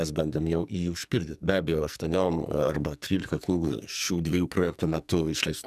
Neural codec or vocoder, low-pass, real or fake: codec, 44.1 kHz, 2.6 kbps, SNAC; 14.4 kHz; fake